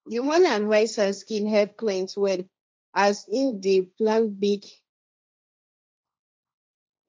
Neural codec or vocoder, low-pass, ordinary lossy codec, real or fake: codec, 16 kHz, 1.1 kbps, Voila-Tokenizer; none; none; fake